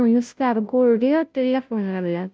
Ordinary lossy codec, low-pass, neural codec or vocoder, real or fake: none; none; codec, 16 kHz, 0.5 kbps, FunCodec, trained on Chinese and English, 25 frames a second; fake